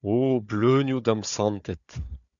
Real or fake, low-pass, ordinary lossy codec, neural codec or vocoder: fake; 7.2 kHz; MP3, 96 kbps; codec, 16 kHz, 4 kbps, FunCodec, trained on Chinese and English, 50 frames a second